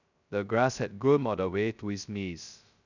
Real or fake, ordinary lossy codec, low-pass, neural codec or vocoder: fake; none; 7.2 kHz; codec, 16 kHz, 0.2 kbps, FocalCodec